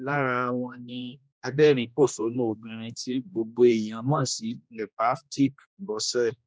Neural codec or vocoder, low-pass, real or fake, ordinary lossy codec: codec, 16 kHz, 1 kbps, X-Codec, HuBERT features, trained on general audio; none; fake; none